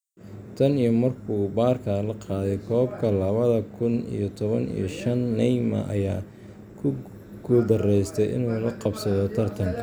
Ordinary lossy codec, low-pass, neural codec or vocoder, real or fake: none; none; none; real